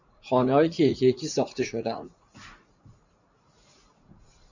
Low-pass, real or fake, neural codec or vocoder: 7.2 kHz; fake; vocoder, 44.1 kHz, 80 mel bands, Vocos